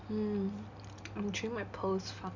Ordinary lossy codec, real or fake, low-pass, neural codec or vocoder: none; real; 7.2 kHz; none